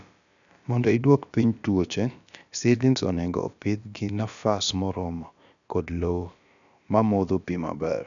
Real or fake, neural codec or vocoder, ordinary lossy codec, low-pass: fake; codec, 16 kHz, about 1 kbps, DyCAST, with the encoder's durations; none; 7.2 kHz